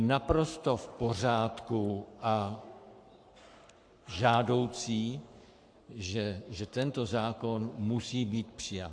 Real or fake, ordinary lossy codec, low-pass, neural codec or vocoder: fake; AAC, 64 kbps; 9.9 kHz; codec, 44.1 kHz, 7.8 kbps, Pupu-Codec